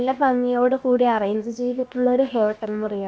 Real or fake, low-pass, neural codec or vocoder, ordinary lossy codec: fake; none; codec, 16 kHz, about 1 kbps, DyCAST, with the encoder's durations; none